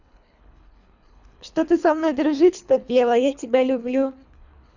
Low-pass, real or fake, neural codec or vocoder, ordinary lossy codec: 7.2 kHz; fake; codec, 24 kHz, 3 kbps, HILCodec; none